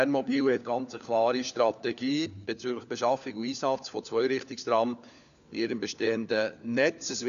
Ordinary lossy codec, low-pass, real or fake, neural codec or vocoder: none; 7.2 kHz; fake; codec, 16 kHz, 4 kbps, FunCodec, trained on LibriTTS, 50 frames a second